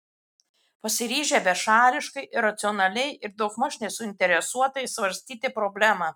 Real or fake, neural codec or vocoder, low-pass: real; none; 19.8 kHz